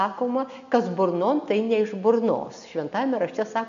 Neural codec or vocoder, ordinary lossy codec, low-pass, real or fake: none; MP3, 64 kbps; 7.2 kHz; real